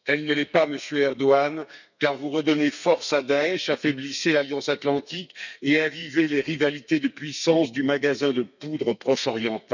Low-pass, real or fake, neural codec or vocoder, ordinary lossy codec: 7.2 kHz; fake; codec, 32 kHz, 1.9 kbps, SNAC; none